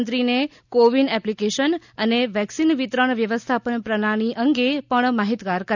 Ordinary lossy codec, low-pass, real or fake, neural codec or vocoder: none; 7.2 kHz; real; none